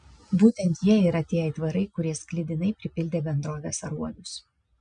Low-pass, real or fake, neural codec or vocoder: 9.9 kHz; real; none